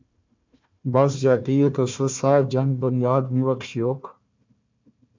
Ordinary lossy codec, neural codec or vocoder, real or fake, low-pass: MP3, 64 kbps; codec, 16 kHz, 1 kbps, FunCodec, trained on Chinese and English, 50 frames a second; fake; 7.2 kHz